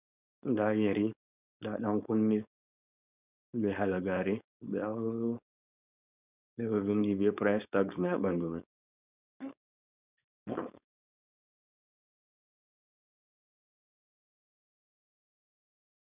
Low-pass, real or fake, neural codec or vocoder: 3.6 kHz; fake; codec, 16 kHz, 4.8 kbps, FACodec